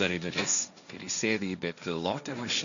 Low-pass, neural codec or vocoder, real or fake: 7.2 kHz; codec, 16 kHz, 1.1 kbps, Voila-Tokenizer; fake